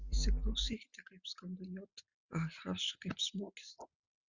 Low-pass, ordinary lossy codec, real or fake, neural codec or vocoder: 7.2 kHz; Opus, 64 kbps; real; none